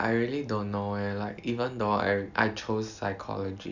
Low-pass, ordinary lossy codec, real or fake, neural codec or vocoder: 7.2 kHz; none; real; none